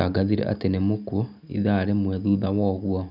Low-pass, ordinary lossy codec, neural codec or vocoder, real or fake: 5.4 kHz; none; none; real